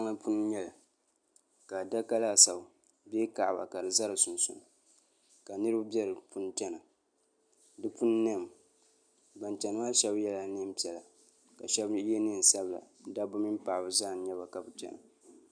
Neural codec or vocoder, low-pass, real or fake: none; 9.9 kHz; real